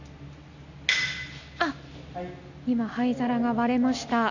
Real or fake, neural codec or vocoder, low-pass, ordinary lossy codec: real; none; 7.2 kHz; none